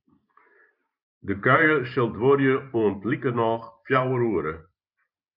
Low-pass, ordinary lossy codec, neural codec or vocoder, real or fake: 5.4 kHz; MP3, 48 kbps; vocoder, 44.1 kHz, 128 mel bands every 512 samples, BigVGAN v2; fake